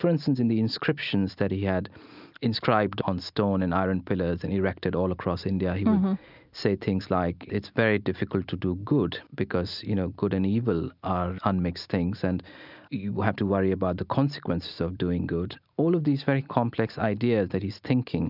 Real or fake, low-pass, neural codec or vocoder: real; 5.4 kHz; none